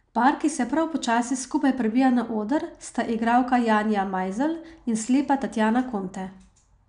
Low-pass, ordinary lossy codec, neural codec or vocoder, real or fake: 9.9 kHz; none; none; real